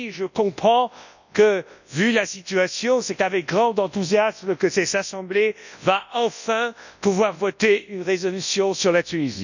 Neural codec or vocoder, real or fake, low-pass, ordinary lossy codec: codec, 24 kHz, 0.9 kbps, WavTokenizer, large speech release; fake; 7.2 kHz; none